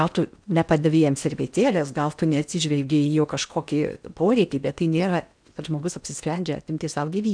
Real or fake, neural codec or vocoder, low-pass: fake; codec, 16 kHz in and 24 kHz out, 0.8 kbps, FocalCodec, streaming, 65536 codes; 9.9 kHz